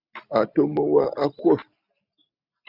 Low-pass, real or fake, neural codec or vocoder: 5.4 kHz; real; none